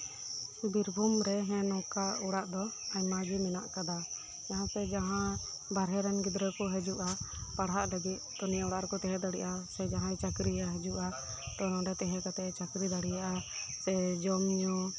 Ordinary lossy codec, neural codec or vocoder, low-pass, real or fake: none; none; none; real